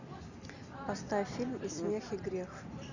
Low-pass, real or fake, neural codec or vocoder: 7.2 kHz; real; none